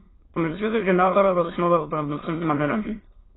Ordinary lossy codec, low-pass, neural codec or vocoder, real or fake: AAC, 16 kbps; 7.2 kHz; autoencoder, 22.05 kHz, a latent of 192 numbers a frame, VITS, trained on many speakers; fake